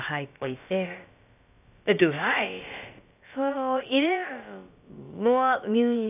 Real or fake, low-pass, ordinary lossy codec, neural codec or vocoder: fake; 3.6 kHz; none; codec, 16 kHz, about 1 kbps, DyCAST, with the encoder's durations